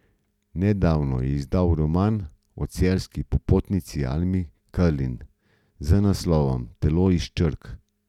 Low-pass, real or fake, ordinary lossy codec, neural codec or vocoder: 19.8 kHz; real; none; none